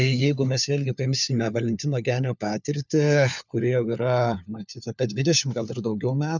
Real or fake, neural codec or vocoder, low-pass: fake; codec, 16 kHz, 4 kbps, FunCodec, trained on LibriTTS, 50 frames a second; 7.2 kHz